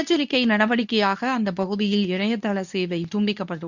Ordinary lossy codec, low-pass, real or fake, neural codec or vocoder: none; 7.2 kHz; fake; codec, 24 kHz, 0.9 kbps, WavTokenizer, medium speech release version 2